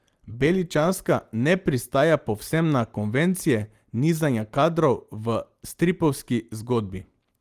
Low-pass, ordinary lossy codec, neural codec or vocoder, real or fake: 14.4 kHz; Opus, 24 kbps; none; real